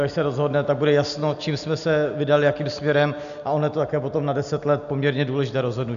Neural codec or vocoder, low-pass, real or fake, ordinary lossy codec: none; 7.2 kHz; real; MP3, 96 kbps